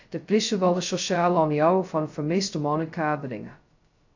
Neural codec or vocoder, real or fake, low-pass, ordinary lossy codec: codec, 16 kHz, 0.2 kbps, FocalCodec; fake; 7.2 kHz; AAC, 48 kbps